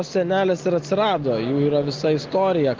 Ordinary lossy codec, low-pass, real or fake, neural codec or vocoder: Opus, 16 kbps; 7.2 kHz; real; none